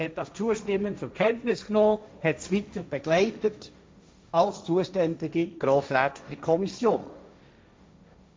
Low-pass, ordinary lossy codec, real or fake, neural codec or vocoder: none; none; fake; codec, 16 kHz, 1.1 kbps, Voila-Tokenizer